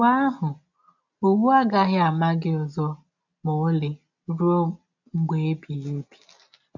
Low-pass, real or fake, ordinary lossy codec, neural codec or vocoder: 7.2 kHz; real; none; none